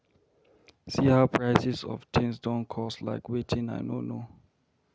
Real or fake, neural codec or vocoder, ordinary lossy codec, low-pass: real; none; none; none